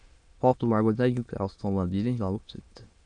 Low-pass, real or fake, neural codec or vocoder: 9.9 kHz; fake; autoencoder, 22.05 kHz, a latent of 192 numbers a frame, VITS, trained on many speakers